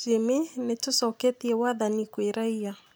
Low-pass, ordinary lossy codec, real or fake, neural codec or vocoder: none; none; real; none